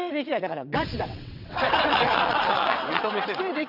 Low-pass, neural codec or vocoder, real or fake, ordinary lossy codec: 5.4 kHz; vocoder, 22.05 kHz, 80 mel bands, WaveNeXt; fake; none